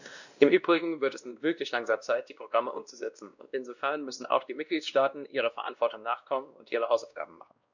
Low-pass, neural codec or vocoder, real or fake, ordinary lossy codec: 7.2 kHz; codec, 16 kHz, 1 kbps, X-Codec, WavLM features, trained on Multilingual LibriSpeech; fake; none